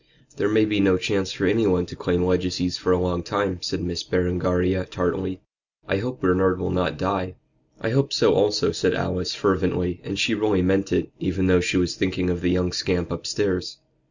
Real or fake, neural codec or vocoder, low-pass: real; none; 7.2 kHz